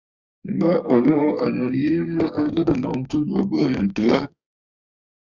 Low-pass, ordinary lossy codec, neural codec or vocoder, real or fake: 7.2 kHz; Opus, 64 kbps; codec, 32 kHz, 1.9 kbps, SNAC; fake